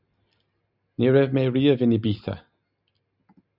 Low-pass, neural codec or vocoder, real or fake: 5.4 kHz; none; real